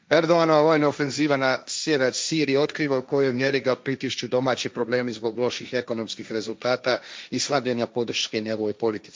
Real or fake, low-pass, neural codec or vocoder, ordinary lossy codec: fake; none; codec, 16 kHz, 1.1 kbps, Voila-Tokenizer; none